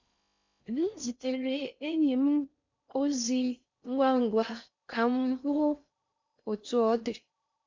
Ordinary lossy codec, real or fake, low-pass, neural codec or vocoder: MP3, 64 kbps; fake; 7.2 kHz; codec, 16 kHz in and 24 kHz out, 0.6 kbps, FocalCodec, streaming, 4096 codes